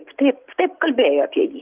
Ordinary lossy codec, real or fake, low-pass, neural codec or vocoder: Opus, 32 kbps; real; 3.6 kHz; none